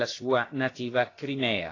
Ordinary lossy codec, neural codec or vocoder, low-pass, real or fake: AAC, 32 kbps; codec, 16 kHz, about 1 kbps, DyCAST, with the encoder's durations; 7.2 kHz; fake